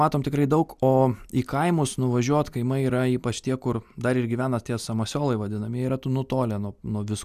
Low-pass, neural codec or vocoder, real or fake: 14.4 kHz; none; real